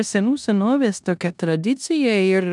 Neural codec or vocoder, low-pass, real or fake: codec, 16 kHz in and 24 kHz out, 0.9 kbps, LongCat-Audio-Codec, four codebook decoder; 10.8 kHz; fake